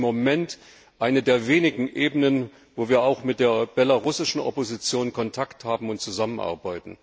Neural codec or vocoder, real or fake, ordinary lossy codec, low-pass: none; real; none; none